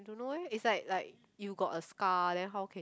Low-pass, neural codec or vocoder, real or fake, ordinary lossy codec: none; none; real; none